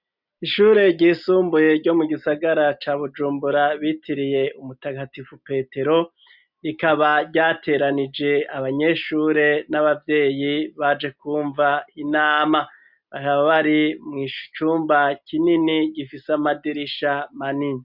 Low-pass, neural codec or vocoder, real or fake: 5.4 kHz; none; real